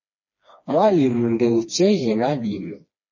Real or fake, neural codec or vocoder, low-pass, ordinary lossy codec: fake; codec, 16 kHz, 2 kbps, FreqCodec, smaller model; 7.2 kHz; MP3, 32 kbps